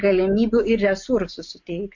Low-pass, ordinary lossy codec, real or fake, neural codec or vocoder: 7.2 kHz; MP3, 48 kbps; real; none